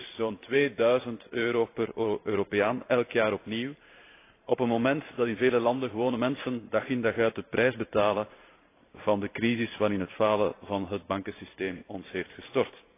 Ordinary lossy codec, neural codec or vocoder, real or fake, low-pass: AAC, 24 kbps; vocoder, 44.1 kHz, 128 mel bands every 512 samples, BigVGAN v2; fake; 3.6 kHz